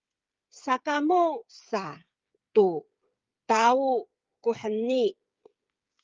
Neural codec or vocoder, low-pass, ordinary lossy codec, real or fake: codec, 16 kHz, 8 kbps, FreqCodec, smaller model; 7.2 kHz; Opus, 32 kbps; fake